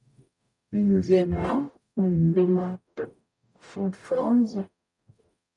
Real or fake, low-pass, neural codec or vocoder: fake; 10.8 kHz; codec, 44.1 kHz, 0.9 kbps, DAC